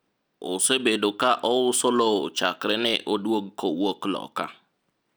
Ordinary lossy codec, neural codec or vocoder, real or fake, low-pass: none; none; real; none